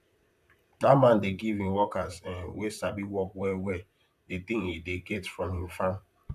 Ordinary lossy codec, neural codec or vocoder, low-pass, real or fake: none; vocoder, 44.1 kHz, 128 mel bands, Pupu-Vocoder; 14.4 kHz; fake